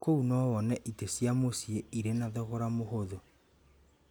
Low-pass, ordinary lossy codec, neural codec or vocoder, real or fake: none; none; none; real